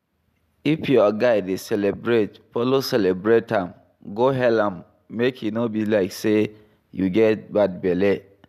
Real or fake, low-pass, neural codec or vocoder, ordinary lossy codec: real; 14.4 kHz; none; none